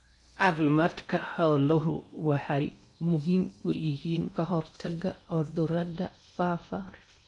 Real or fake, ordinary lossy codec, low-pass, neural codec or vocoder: fake; none; 10.8 kHz; codec, 16 kHz in and 24 kHz out, 0.6 kbps, FocalCodec, streaming, 4096 codes